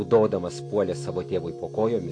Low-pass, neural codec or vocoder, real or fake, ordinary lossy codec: 9.9 kHz; none; real; AAC, 48 kbps